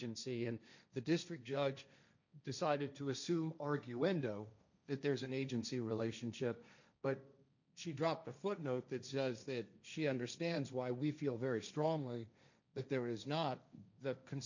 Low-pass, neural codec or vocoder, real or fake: 7.2 kHz; codec, 16 kHz, 1.1 kbps, Voila-Tokenizer; fake